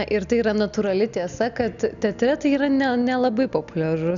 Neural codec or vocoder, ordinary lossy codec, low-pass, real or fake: none; MP3, 96 kbps; 7.2 kHz; real